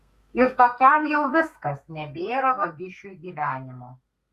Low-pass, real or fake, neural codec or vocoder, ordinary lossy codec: 14.4 kHz; fake; codec, 32 kHz, 1.9 kbps, SNAC; Opus, 64 kbps